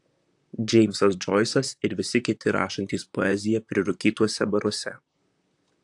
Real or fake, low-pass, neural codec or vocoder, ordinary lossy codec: fake; 10.8 kHz; vocoder, 44.1 kHz, 128 mel bands, Pupu-Vocoder; AAC, 64 kbps